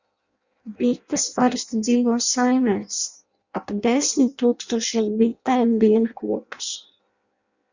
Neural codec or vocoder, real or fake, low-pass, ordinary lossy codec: codec, 16 kHz in and 24 kHz out, 0.6 kbps, FireRedTTS-2 codec; fake; 7.2 kHz; Opus, 64 kbps